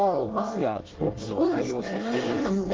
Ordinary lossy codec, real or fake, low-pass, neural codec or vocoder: Opus, 16 kbps; fake; 7.2 kHz; codec, 24 kHz, 1 kbps, SNAC